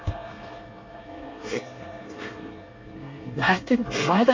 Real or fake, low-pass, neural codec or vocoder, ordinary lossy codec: fake; 7.2 kHz; codec, 24 kHz, 1 kbps, SNAC; MP3, 48 kbps